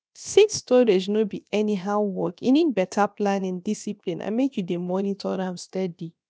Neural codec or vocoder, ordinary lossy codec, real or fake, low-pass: codec, 16 kHz, about 1 kbps, DyCAST, with the encoder's durations; none; fake; none